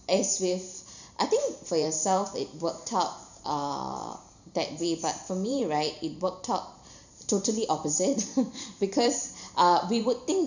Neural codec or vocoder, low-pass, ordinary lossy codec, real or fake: vocoder, 44.1 kHz, 128 mel bands every 512 samples, BigVGAN v2; 7.2 kHz; none; fake